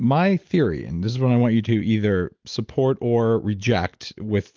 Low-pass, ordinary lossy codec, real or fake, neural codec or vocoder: 7.2 kHz; Opus, 32 kbps; real; none